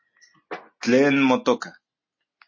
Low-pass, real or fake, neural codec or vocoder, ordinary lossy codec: 7.2 kHz; real; none; MP3, 32 kbps